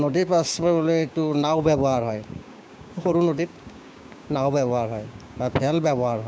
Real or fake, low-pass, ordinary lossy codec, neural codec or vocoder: fake; none; none; codec, 16 kHz, 6 kbps, DAC